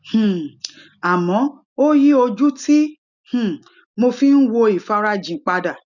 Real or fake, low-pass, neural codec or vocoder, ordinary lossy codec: real; 7.2 kHz; none; none